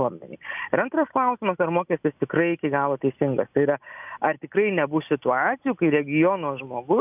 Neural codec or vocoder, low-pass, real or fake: none; 3.6 kHz; real